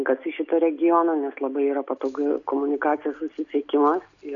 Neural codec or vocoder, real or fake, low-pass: none; real; 7.2 kHz